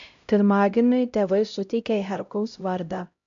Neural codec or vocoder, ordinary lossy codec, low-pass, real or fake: codec, 16 kHz, 0.5 kbps, X-Codec, HuBERT features, trained on LibriSpeech; AAC, 64 kbps; 7.2 kHz; fake